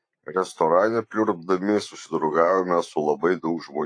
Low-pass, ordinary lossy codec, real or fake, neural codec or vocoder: 9.9 kHz; AAC, 48 kbps; fake; vocoder, 44.1 kHz, 128 mel bands every 512 samples, BigVGAN v2